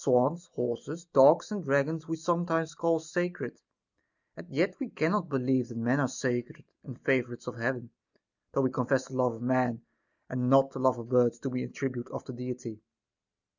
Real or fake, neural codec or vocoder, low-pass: real; none; 7.2 kHz